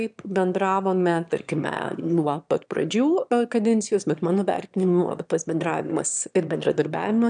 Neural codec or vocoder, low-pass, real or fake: autoencoder, 22.05 kHz, a latent of 192 numbers a frame, VITS, trained on one speaker; 9.9 kHz; fake